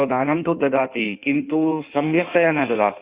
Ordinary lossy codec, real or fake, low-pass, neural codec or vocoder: Opus, 64 kbps; fake; 3.6 kHz; codec, 16 kHz in and 24 kHz out, 1.1 kbps, FireRedTTS-2 codec